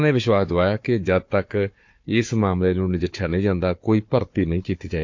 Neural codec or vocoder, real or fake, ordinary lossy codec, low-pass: codec, 16 kHz, 4 kbps, FunCodec, trained on Chinese and English, 50 frames a second; fake; MP3, 48 kbps; 7.2 kHz